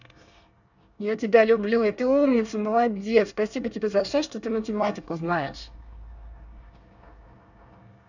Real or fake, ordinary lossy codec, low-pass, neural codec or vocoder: fake; none; 7.2 kHz; codec, 24 kHz, 1 kbps, SNAC